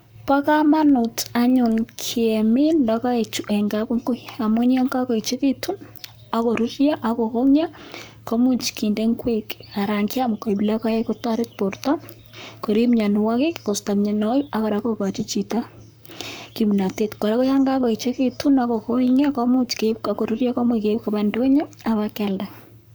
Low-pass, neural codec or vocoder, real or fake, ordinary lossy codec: none; codec, 44.1 kHz, 7.8 kbps, Pupu-Codec; fake; none